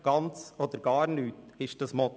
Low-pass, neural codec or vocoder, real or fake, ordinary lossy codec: none; none; real; none